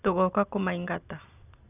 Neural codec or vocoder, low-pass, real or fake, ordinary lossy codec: none; 3.6 kHz; real; none